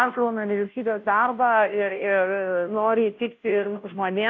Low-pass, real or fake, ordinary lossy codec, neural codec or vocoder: 7.2 kHz; fake; AAC, 48 kbps; codec, 16 kHz, 0.5 kbps, FunCodec, trained on Chinese and English, 25 frames a second